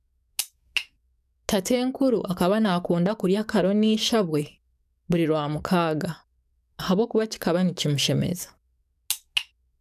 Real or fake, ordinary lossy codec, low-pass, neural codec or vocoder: fake; none; 14.4 kHz; codec, 44.1 kHz, 7.8 kbps, DAC